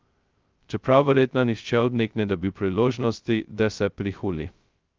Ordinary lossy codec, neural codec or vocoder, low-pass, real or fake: Opus, 24 kbps; codec, 16 kHz, 0.2 kbps, FocalCodec; 7.2 kHz; fake